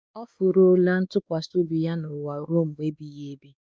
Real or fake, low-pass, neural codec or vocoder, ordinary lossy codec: fake; none; codec, 16 kHz, 2 kbps, X-Codec, WavLM features, trained on Multilingual LibriSpeech; none